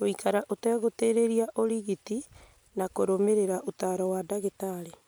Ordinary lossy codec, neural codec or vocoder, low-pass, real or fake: none; none; none; real